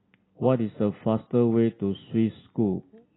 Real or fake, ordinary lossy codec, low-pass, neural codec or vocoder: real; AAC, 16 kbps; 7.2 kHz; none